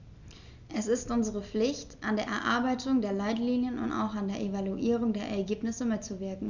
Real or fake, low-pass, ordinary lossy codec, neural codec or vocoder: real; 7.2 kHz; none; none